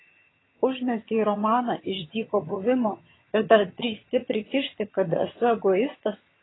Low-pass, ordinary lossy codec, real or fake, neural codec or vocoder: 7.2 kHz; AAC, 16 kbps; fake; vocoder, 22.05 kHz, 80 mel bands, HiFi-GAN